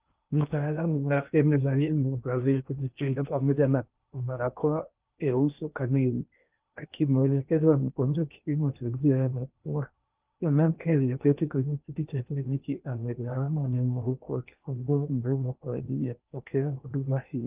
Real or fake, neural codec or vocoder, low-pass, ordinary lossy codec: fake; codec, 16 kHz in and 24 kHz out, 0.8 kbps, FocalCodec, streaming, 65536 codes; 3.6 kHz; Opus, 24 kbps